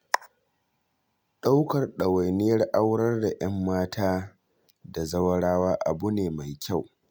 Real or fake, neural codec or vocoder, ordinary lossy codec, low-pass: real; none; none; none